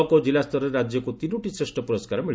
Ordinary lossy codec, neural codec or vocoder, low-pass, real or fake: none; none; none; real